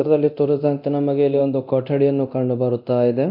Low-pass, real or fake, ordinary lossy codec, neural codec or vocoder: 5.4 kHz; fake; MP3, 48 kbps; codec, 24 kHz, 0.9 kbps, DualCodec